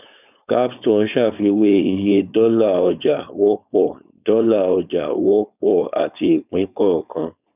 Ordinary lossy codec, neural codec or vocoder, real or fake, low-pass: none; codec, 16 kHz, 4.8 kbps, FACodec; fake; 3.6 kHz